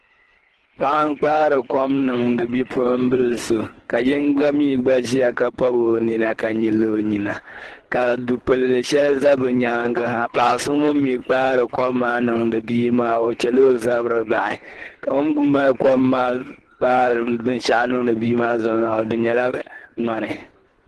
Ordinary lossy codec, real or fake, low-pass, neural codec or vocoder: Opus, 16 kbps; fake; 10.8 kHz; codec, 24 kHz, 3 kbps, HILCodec